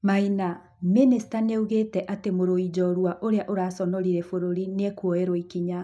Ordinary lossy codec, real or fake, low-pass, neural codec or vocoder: none; real; none; none